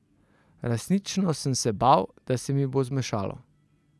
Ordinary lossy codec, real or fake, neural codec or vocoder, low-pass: none; real; none; none